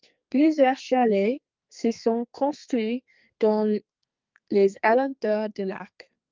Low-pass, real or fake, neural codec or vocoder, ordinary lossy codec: 7.2 kHz; fake; codec, 32 kHz, 1.9 kbps, SNAC; Opus, 24 kbps